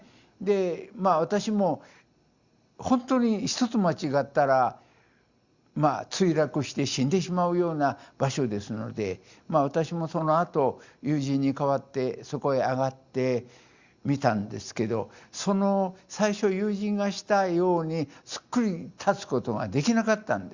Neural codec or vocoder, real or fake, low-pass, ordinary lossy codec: none; real; 7.2 kHz; Opus, 64 kbps